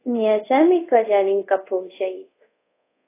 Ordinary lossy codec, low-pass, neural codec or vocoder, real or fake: AAC, 24 kbps; 3.6 kHz; codec, 24 kHz, 0.5 kbps, DualCodec; fake